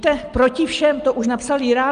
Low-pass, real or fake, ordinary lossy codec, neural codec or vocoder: 9.9 kHz; real; Opus, 32 kbps; none